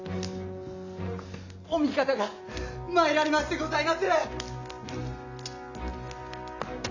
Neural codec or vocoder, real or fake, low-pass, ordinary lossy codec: none; real; 7.2 kHz; none